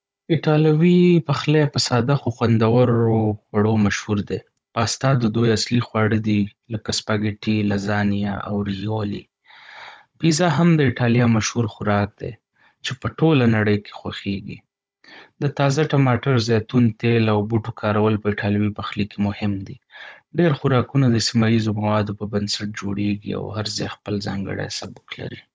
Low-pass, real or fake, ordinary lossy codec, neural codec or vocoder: none; fake; none; codec, 16 kHz, 16 kbps, FunCodec, trained on Chinese and English, 50 frames a second